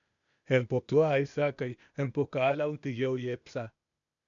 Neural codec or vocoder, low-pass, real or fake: codec, 16 kHz, 0.8 kbps, ZipCodec; 7.2 kHz; fake